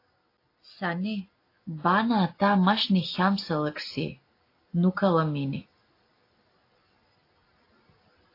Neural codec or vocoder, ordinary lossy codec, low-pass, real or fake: none; AAC, 32 kbps; 5.4 kHz; real